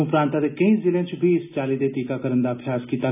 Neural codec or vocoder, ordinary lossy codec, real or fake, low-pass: none; none; real; 3.6 kHz